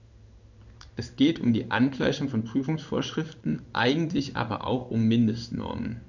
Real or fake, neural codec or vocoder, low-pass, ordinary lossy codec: fake; codec, 16 kHz in and 24 kHz out, 1 kbps, XY-Tokenizer; 7.2 kHz; none